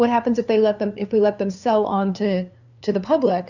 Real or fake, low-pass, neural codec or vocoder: fake; 7.2 kHz; codec, 16 kHz, 2 kbps, FunCodec, trained on LibriTTS, 25 frames a second